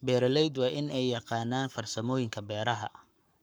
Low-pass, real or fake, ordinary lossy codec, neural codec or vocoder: none; fake; none; codec, 44.1 kHz, 7.8 kbps, Pupu-Codec